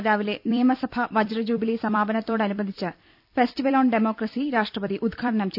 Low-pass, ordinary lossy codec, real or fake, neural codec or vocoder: 5.4 kHz; none; fake; vocoder, 44.1 kHz, 128 mel bands every 256 samples, BigVGAN v2